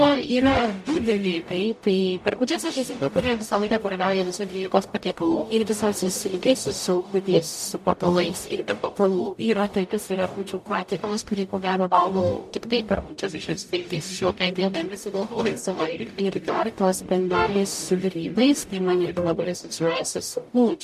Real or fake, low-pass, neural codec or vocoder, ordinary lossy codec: fake; 14.4 kHz; codec, 44.1 kHz, 0.9 kbps, DAC; MP3, 64 kbps